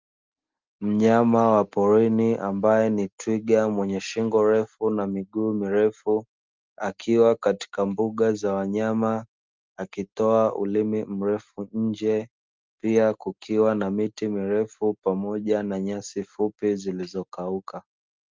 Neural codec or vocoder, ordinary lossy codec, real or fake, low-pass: none; Opus, 32 kbps; real; 7.2 kHz